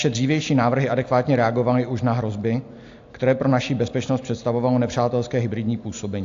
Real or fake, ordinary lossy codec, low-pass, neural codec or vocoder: real; AAC, 64 kbps; 7.2 kHz; none